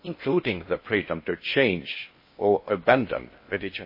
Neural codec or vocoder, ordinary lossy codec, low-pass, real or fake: codec, 16 kHz in and 24 kHz out, 0.6 kbps, FocalCodec, streaming, 4096 codes; MP3, 24 kbps; 5.4 kHz; fake